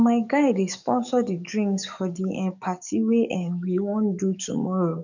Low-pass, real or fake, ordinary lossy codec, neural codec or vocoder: 7.2 kHz; fake; none; codec, 44.1 kHz, 7.8 kbps, DAC